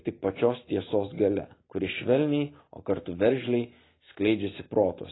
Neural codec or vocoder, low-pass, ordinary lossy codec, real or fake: none; 7.2 kHz; AAC, 16 kbps; real